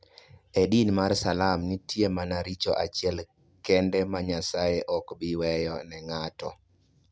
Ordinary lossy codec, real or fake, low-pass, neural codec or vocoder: none; real; none; none